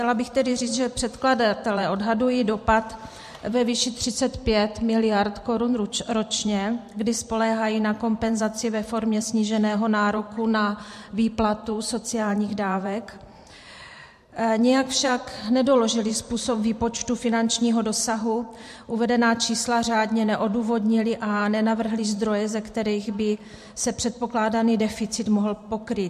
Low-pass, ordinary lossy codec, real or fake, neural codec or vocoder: 14.4 kHz; MP3, 64 kbps; fake; vocoder, 44.1 kHz, 128 mel bands every 512 samples, BigVGAN v2